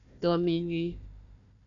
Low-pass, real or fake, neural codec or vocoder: 7.2 kHz; fake; codec, 16 kHz, 1 kbps, FunCodec, trained on Chinese and English, 50 frames a second